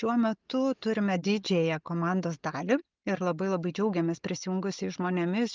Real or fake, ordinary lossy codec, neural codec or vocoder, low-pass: real; Opus, 32 kbps; none; 7.2 kHz